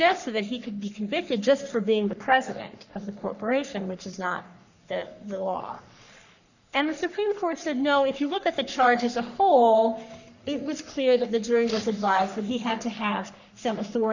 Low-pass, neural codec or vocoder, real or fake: 7.2 kHz; codec, 44.1 kHz, 3.4 kbps, Pupu-Codec; fake